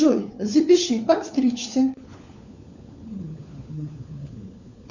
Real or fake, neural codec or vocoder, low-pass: fake; codec, 16 kHz, 4 kbps, FunCodec, trained on LibriTTS, 50 frames a second; 7.2 kHz